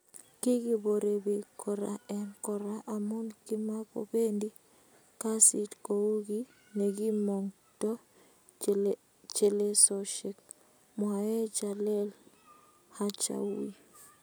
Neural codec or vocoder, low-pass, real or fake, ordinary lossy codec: none; none; real; none